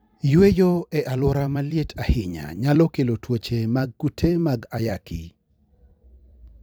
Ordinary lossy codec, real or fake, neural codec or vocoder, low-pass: none; fake; vocoder, 44.1 kHz, 128 mel bands every 256 samples, BigVGAN v2; none